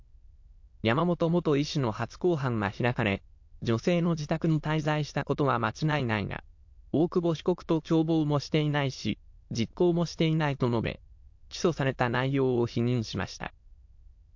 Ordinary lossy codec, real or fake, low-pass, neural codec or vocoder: MP3, 48 kbps; fake; 7.2 kHz; autoencoder, 22.05 kHz, a latent of 192 numbers a frame, VITS, trained on many speakers